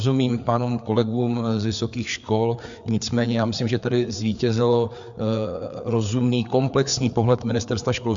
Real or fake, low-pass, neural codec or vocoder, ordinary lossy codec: fake; 7.2 kHz; codec, 16 kHz, 4 kbps, FreqCodec, larger model; MP3, 64 kbps